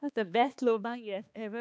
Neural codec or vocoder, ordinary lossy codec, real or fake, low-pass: codec, 16 kHz, 2 kbps, X-Codec, HuBERT features, trained on balanced general audio; none; fake; none